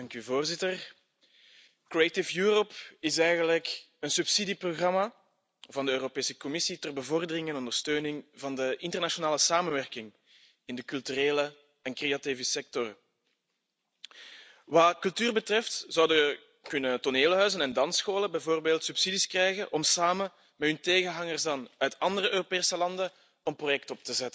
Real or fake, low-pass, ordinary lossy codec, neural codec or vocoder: real; none; none; none